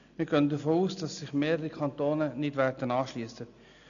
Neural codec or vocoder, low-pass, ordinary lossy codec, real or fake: none; 7.2 kHz; none; real